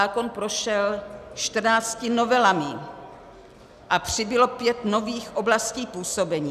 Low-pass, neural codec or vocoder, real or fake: 14.4 kHz; none; real